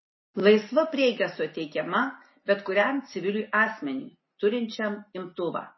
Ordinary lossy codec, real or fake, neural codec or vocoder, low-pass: MP3, 24 kbps; real; none; 7.2 kHz